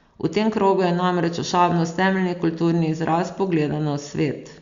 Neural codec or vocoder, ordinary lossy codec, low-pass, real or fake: none; Opus, 64 kbps; 7.2 kHz; real